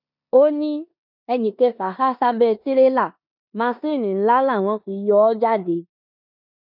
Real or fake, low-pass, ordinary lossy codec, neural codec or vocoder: fake; 5.4 kHz; none; codec, 16 kHz in and 24 kHz out, 0.9 kbps, LongCat-Audio-Codec, four codebook decoder